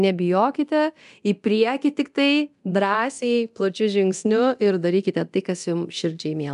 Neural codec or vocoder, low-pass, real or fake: codec, 24 kHz, 0.9 kbps, DualCodec; 10.8 kHz; fake